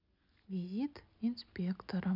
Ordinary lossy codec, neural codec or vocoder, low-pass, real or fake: none; none; 5.4 kHz; real